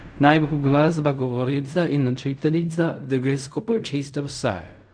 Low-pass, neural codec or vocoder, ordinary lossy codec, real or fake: 9.9 kHz; codec, 16 kHz in and 24 kHz out, 0.4 kbps, LongCat-Audio-Codec, fine tuned four codebook decoder; none; fake